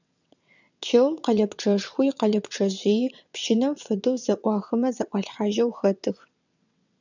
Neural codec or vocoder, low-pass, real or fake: vocoder, 22.05 kHz, 80 mel bands, WaveNeXt; 7.2 kHz; fake